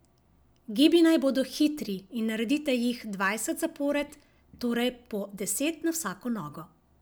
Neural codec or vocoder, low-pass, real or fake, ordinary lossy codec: none; none; real; none